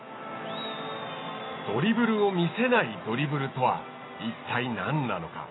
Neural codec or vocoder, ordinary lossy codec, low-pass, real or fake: none; AAC, 16 kbps; 7.2 kHz; real